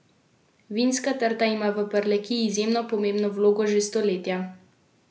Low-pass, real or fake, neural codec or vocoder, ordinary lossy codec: none; real; none; none